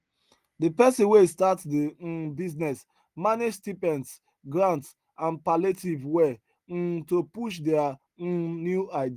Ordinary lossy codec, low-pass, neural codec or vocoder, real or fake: Opus, 24 kbps; 14.4 kHz; none; real